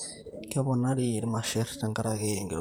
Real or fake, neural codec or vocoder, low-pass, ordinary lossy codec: fake; vocoder, 44.1 kHz, 128 mel bands, Pupu-Vocoder; none; none